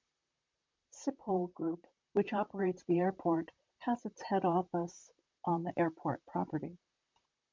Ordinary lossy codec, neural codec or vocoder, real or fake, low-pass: MP3, 64 kbps; vocoder, 44.1 kHz, 128 mel bands, Pupu-Vocoder; fake; 7.2 kHz